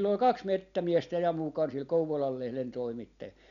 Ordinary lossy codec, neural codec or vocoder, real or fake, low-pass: none; none; real; 7.2 kHz